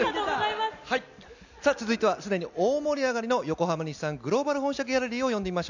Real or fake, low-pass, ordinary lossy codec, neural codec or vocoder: real; 7.2 kHz; none; none